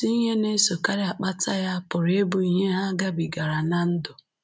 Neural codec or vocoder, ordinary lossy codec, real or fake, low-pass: none; none; real; none